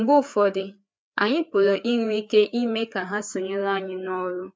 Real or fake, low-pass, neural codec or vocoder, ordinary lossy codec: fake; none; codec, 16 kHz, 4 kbps, FreqCodec, larger model; none